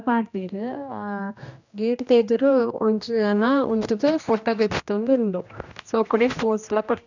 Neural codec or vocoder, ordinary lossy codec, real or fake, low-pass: codec, 16 kHz, 1 kbps, X-Codec, HuBERT features, trained on general audio; none; fake; 7.2 kHz